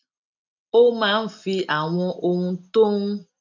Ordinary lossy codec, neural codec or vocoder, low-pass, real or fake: none; none; 7.2 kHz; real